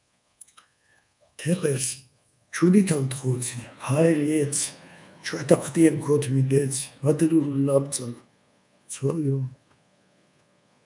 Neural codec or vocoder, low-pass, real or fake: codec, 24 kHz, 1.2 kbps, DualCodec; 10.8 kHz; fake